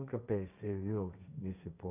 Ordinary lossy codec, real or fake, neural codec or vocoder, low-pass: none; fake; codec, 16 kHz, 1.1 kbps, Voila-Tokenizer; 3.6 kHz